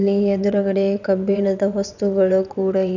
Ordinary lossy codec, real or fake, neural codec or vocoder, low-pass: none; fake; vocoder, 22.05 kHz, 80 mel bands, WaveNeXt; 7.2 kHz